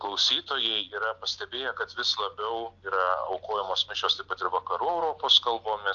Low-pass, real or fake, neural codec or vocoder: 7.2 kHz; real; none